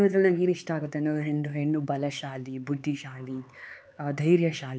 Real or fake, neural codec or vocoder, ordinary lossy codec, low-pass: fake; codec, 16 kHz, 4 kbps, X-Codec, HuBERT features, trained on LibriSpeech; none; none